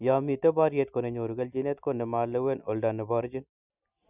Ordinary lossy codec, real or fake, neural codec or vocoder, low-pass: none; real; none; 3.6 kHz